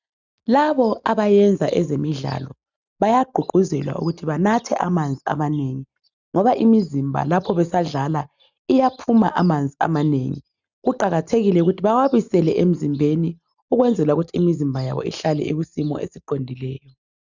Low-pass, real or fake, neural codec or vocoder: 7.2 kHz; real; none